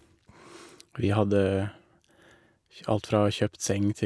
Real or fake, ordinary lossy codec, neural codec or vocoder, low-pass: real; none; none; none